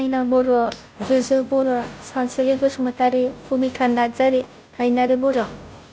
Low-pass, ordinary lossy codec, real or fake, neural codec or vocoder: none; none; fake; codec, 16 kHz, 0.5 kbps, FunCodec, trained on Chinese and English, 25 frames a second